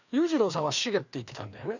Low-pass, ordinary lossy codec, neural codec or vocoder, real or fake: 7.2 kHz; none; codec, 16 kHz, 2 kbps, FreqCodec, larger model; fake